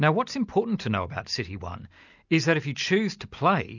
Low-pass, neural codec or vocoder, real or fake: 7.2 kHz; none; real